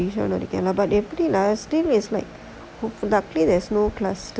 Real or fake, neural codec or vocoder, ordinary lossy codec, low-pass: real; none; none; none